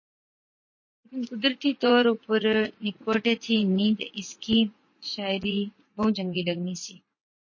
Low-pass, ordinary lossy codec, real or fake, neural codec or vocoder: 7.2 kHz; MP3, 32 kbps; fake; vocoder, 44.1 kHz, 80 mel bands, Vocos